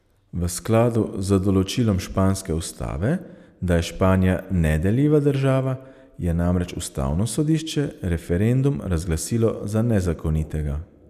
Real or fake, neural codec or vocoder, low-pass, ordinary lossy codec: real; none; 14.4 kHz; none